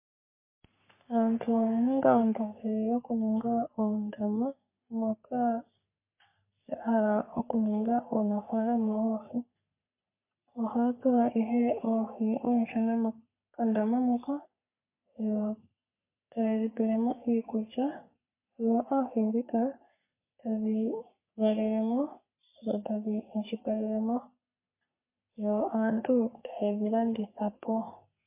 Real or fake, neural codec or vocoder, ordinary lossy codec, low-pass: fake; codec, 44.1 kHz, 3.4 kbps, Pupu-Codec; MP3, 24 kbps; 3.6 kHz